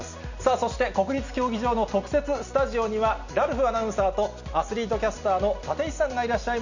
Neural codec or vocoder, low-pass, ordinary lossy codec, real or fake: none; 7.2 kHz; none; real